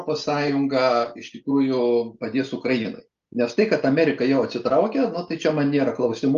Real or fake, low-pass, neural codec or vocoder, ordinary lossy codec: real; 7.2 kHz; none; Opus, 32 kbps